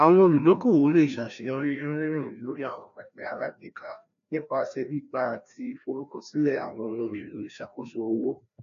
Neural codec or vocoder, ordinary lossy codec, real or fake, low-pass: codec, 16 kHz, 1 kbps, FreqCodec, larger model; none; fake; 7.2 kHz